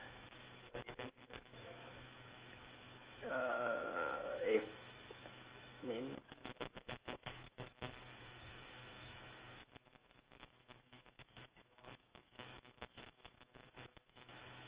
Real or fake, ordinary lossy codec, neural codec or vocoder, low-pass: fake; Opus, 32 kbps; autoencoder, 48 kHz, 128 numbers a frame, DAC-VAE, trained on Japanese speech; 3.6 kHz